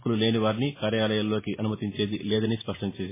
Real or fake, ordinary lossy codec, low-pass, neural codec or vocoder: real; MP3, 16 kbps; 3.6 kHz; none